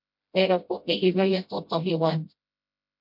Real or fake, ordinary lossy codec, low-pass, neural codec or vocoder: fake; MP3, 48 kbps; 5.4 kHz; codec, 16 kHz, 0.5 kbps, FreqCodec, smaller model